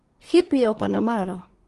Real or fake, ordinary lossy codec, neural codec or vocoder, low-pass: fake; Opus, 24 kbps; codec, 24 kHz, 1 kbps, SNAC; 10.8 kHz